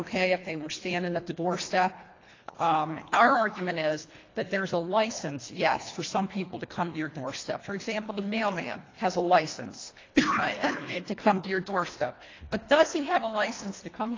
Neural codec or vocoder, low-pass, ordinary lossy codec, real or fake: codec, 24 kHz, 1.5 kbps, HILCodec; 7.2 kHz; AAC, 32 kbps; fake